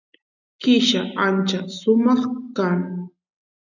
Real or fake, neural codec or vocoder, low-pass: real; none; 7.2 kHz